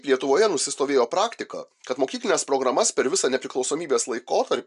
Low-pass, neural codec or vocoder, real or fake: 10.8 kHz; none; real